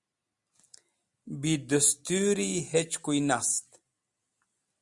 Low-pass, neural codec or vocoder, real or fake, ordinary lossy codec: 10.8 kHz; none; real; Opus, 64 kbps